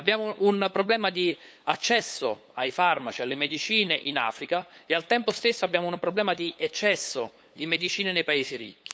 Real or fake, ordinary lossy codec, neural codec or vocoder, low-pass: fake; none; codec, 16 kHz, 8 kbps, FunCodec, trained on LibriTTS, 25 frames a second; none